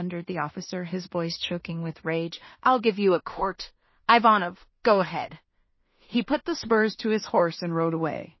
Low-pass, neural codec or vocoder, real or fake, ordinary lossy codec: 7.2 kHz; codec, 16 kHz in and 24 kHz out, 0.4 kbps, LongCat-Audio-Codec, two codebook decoder; fake; MP3, 24 kbps